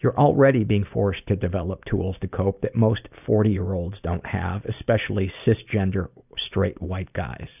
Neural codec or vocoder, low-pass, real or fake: none; 3.6 kHz; real